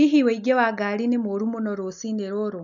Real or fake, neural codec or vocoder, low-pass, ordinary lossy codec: real; none; 7.2 kHz; none